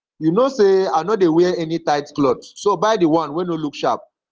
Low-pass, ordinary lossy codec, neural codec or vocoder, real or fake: 7.2 kHz; Opus, 16 kbps; none; real